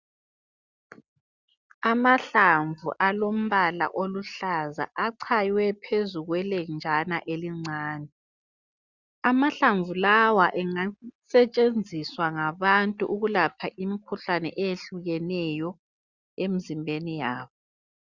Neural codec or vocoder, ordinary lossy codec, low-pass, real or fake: none; Opus, 64 kbps; 7.2 kHz; real